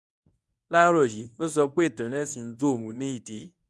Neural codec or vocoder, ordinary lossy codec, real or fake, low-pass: codec, 24 kHz, 0.9 kbps, WavTokenizer, medium speech release version 1; none; fake; none